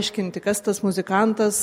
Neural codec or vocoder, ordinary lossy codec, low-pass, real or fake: none; MP3, 64 kbps; 19.8 kHz; real